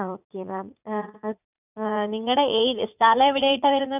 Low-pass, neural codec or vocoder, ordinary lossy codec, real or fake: 3.6 kHz; vocoder, 44.1 kHz, 80 mel bands, Vocos; none; fake